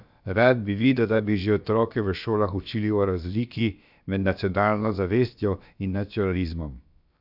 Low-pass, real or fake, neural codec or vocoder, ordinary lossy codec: 5.4 kHz; fake; codec, 16 kHz, about 1 kbps, DyCAST, with the encoder's durations; none